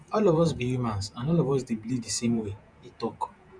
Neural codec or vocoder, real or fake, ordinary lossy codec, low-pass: vocoder, 48 kHz, 128 mel bands, Vocos; fake; none; 9.9 kHz